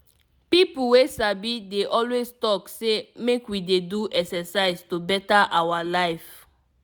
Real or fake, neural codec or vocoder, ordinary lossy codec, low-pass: real; none; none; none